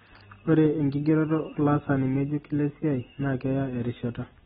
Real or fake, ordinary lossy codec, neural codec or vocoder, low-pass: real; AAC, 16 kbps; none; 19.8 kHz